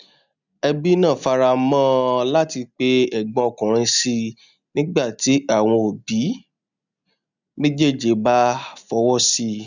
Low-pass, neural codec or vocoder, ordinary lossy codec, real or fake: 7.2 kHz; none; none; real